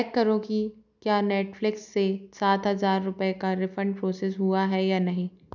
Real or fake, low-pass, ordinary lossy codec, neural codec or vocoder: real; 7.2 kHz; none; none